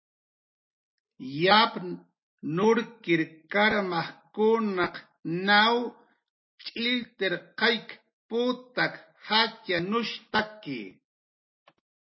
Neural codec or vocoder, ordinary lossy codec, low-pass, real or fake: none; MP3, 24 kbps; 7.2 kHz; real